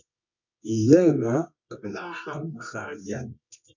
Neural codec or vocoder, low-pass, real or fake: codec, 24 kHz, 0.9 kbps, WavTokenizer, medium music audio release; 7.2 kHz; fake